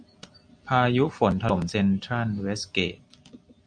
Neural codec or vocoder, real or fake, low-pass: none; real; 9.9 kHz